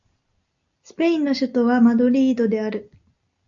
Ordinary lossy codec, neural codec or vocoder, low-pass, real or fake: AAC, 64 kbps; none; 7.2 kHz; real